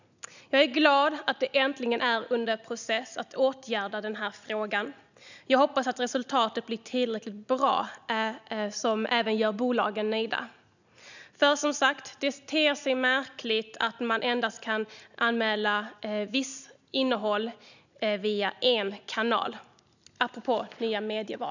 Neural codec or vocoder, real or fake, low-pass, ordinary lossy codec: none; real; 7.2 kHz; none